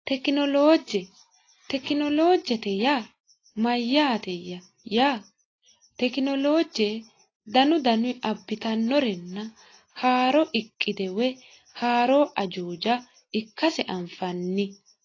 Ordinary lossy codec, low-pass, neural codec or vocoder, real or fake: AAC, 32 kbps; 7.2 kHz; none; real